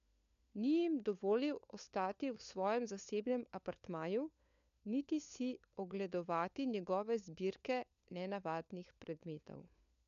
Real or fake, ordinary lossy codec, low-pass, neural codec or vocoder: fake; none; 7.2 kHz; codec, 16 kHz, 4 kbps, FunCodec, trained on LibriTTS, 50 frames a second